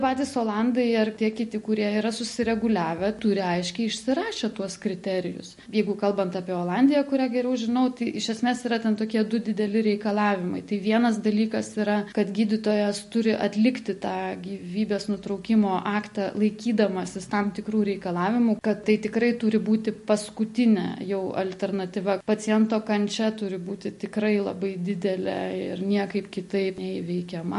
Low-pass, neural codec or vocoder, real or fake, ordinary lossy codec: 14.4 kHz; none; real; MP3, 48 kbps